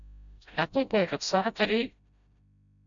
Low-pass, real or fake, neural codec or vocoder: 7.2 kHz; fake; codec, 16 kHz, 0.5 kbps, FreqCodec, smaller model